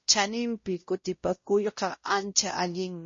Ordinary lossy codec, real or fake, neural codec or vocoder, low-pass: MP3, 32 kbps; fake; codec, 16 kHz, 0.5 kbps, X-Codec, WavLM features, trained on Multilingual LibriSpeech; 7.2 kHz